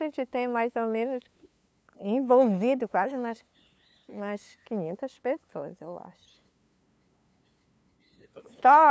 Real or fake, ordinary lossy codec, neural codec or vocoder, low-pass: fake; none; codec, 16 kHz, 2 kbps, FunCodec, trained on LibriTTS, 25 frames a second; none